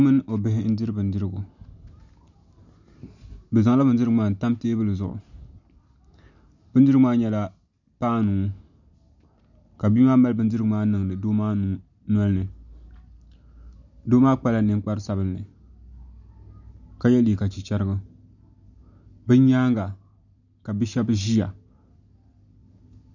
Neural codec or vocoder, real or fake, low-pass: none; real; 7.2 kHz